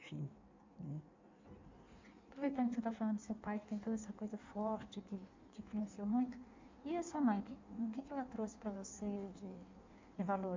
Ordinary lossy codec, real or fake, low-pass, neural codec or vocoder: MP3, 64 kbps; fake; 7.2 kHz; codec, 16 kHz in and 24 kHz out, 1.1 kbps, FireRedTTS-2 codec